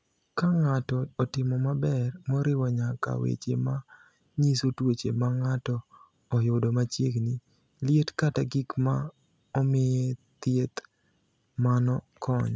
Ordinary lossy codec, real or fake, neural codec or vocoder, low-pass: none; real; none; none